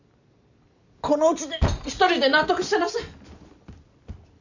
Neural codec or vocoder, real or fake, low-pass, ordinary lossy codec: none; real; 7.2 kHz; none